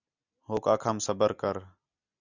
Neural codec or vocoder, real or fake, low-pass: none; real; 7.2 kHz